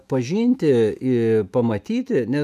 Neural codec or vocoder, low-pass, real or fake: autoencoder, 48 kHz, 128 numbers a frame, DAC-VAE, trained on Japanese speech; 14.4 kHz; fake